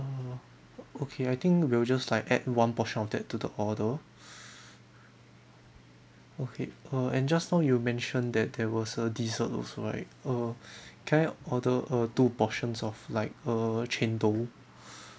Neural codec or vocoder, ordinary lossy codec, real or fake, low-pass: none; none; real; none